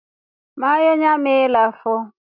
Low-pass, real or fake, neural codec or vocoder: 5.4 kHz; real; none